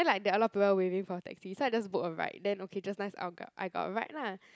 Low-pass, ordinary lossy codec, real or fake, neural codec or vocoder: none; none; real; none